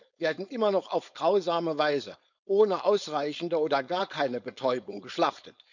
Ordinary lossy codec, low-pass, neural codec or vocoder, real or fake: none; 7.2 kHz; codec, 16 kHz, 4.8 kbps, FACodec; fake